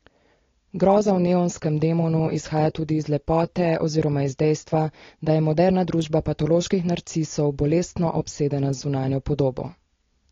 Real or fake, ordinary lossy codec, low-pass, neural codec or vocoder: real; AAC, 32 kbps; 7.2 kHz; none